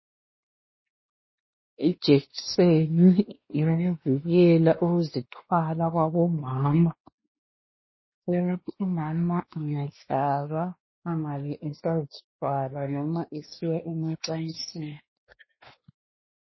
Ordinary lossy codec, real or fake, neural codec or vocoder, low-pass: MP3, 24 kbps; fake; codec, 16 kHz, 2 kbps, X-Codec, WavLM features, trained on Multilingual LibriSpeech; 7.2 kHz